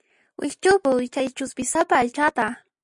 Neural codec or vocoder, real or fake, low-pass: none; real; 10.8 kHz